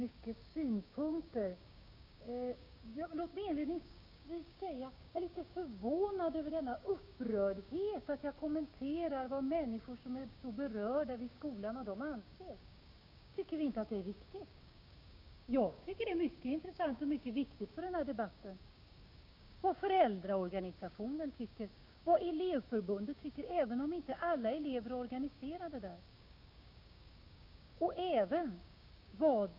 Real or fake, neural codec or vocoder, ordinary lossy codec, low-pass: fake; codec, 16 kHz, 6 kbps, DAC; none; 5.4 kHz